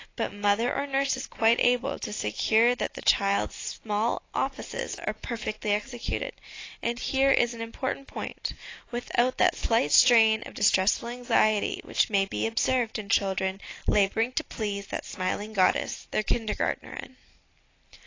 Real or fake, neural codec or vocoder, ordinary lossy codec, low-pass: real; none; AAC, 32 kbps; 7.2 kHz